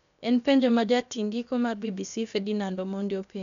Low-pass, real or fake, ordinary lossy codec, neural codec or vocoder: 7.2 kHz; fake; none; codec, 16 kHz, about 1 kbps, DyCAST, with the encoder's durations